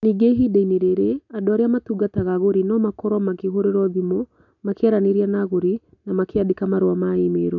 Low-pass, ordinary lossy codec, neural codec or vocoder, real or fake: 7.2 kHz; none; none; real